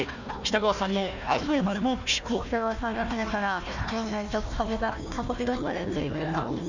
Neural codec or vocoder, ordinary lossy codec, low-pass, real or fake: codec, 16 kHz, 1 kbps, FunCodec, trained on Chinese and English, 50 frames a second; none; 7.2 kHz; fake